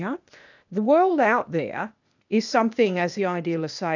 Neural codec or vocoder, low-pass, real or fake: codec, 16 kHz, 0.8 kbps, ZipCodec; 7.2 kHz; fake